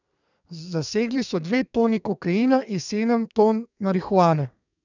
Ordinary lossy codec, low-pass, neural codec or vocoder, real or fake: none; 7.2 kHz; codec, 32 kHz, 1.9 kbps, SNAC; fake